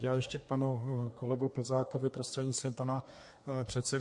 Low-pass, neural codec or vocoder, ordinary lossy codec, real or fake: 10.8 kHz; codec, 24 kHz, 1 kbps, SNAC; MP3, 48 kbps; fake